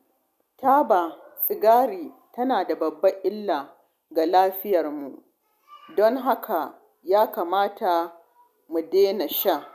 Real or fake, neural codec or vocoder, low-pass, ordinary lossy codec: real; none; 14.4 kHz; none